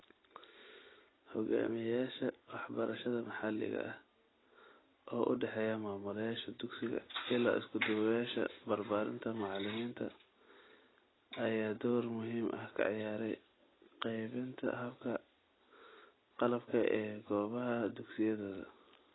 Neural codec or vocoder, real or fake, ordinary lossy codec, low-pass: none; real; AAC, 16 kbps; 7.2 kHz